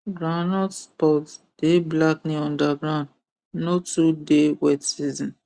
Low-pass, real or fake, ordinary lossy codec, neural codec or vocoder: 9.9 kHz; real; Opus, 64 kbps; none